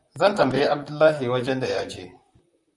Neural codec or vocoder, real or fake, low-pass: vocoder, 44.1 kHz, 128 mel bands, Pupu-Vocoder; fake; 10.8 kHz